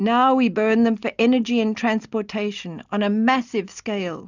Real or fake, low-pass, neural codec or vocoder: real; 7.2 kHz; none